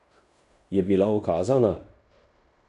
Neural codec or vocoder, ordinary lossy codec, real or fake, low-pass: codec, 16 kHz in and 24 kHz out, 0.9 kbps, LongCat-Audio-Codec, fine tuned four codebook decoder; none; fake; 10.8 kHz